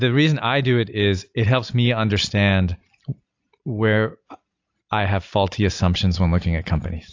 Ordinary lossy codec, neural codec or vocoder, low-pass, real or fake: AAC, 48 kbps; none; 7.2 kHz; real